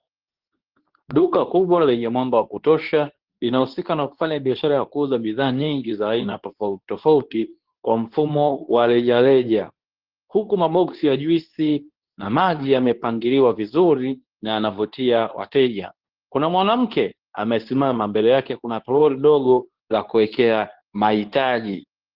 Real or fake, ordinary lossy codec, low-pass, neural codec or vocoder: fake; Opus, 16 kbps; 5.4 kHz; codec, 24 kHz, 0.9 kbps, WavTokenizer, medium speech release version 2